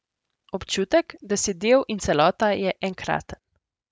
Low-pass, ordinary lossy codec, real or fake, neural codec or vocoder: none; none; real; none